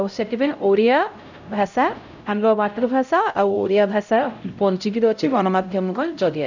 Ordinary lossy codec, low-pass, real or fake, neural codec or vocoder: none; 7.2 kHz; fake; codec, 16 kHz, 0.5 kbps, X-Codec, HuBERT features, trained on LibriSpeech